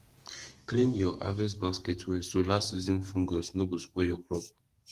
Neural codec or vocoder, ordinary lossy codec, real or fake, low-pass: codec, 32 kHz, 1.9 kbps, SNAC; Opus, 24 kbps; fake; 14.4 kHz